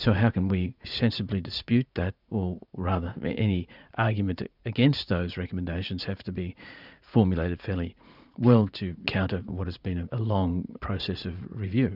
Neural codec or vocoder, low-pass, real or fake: vocoder, 44.1 kHz, 80 mel bands, Vocos; 5.4 kHz; fake